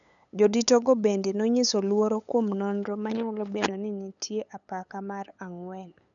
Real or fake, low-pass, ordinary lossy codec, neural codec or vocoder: fake; 7.2 kHz; none; codec, 16 kHz, 8 kbps, FunCodec, trained on LibriTTS, 25 frames a second